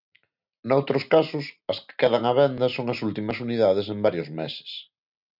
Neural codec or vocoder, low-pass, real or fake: none; 5.4 kHz; real